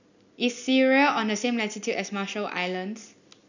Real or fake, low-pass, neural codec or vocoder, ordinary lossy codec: real; 7.2 kHz; none; none